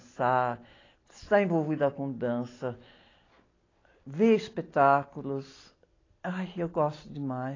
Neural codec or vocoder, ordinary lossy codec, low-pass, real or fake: none; AAC, 32 kbps; 7.2 kHz; real